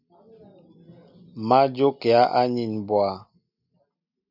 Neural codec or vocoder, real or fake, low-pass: none; real; 5.4 kHz